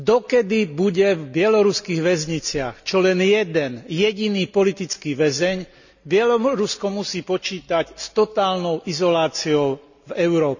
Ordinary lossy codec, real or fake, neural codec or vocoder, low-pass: none; real; none; 7.2 kHz